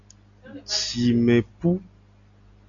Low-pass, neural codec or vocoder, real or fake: 7.2 kHz; none; real